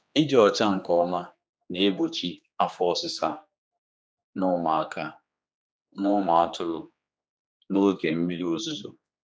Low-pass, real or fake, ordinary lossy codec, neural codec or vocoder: none; fake; none; codec, 16 kHz, 2 kbps, X-Codec, HuBERT features, trained on general audio